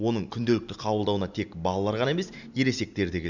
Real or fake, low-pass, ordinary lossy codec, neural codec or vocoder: real; 7.2 kHz; none; none